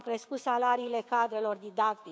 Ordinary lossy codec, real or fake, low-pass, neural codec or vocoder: none; fake; none; codec, 16 kHz, 6 kbps, DAC